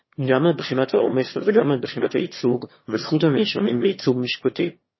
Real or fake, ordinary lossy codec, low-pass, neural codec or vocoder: fake; MP3, 24 kbps; 7.2 kHz; autoencoder, 22.05 kHz, a latent of 192 numbers a frame, VITS, trained on one speaker